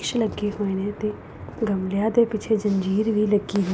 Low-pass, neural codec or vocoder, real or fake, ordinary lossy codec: none; none; real; none